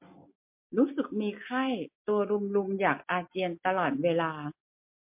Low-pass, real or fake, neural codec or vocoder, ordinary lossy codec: 3.6 kHz; real; none; MP3, 32 kbps